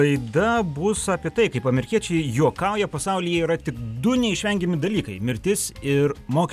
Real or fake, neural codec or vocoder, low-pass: real; none; 14.4 kHz